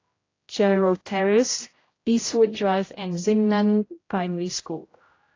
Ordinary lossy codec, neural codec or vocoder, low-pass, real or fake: AAC, 32 kbps; codec, 16 kHz, 0.5 kbps, X-Codec, HuBERT features, trained on general audio; 7.2 kHz; fake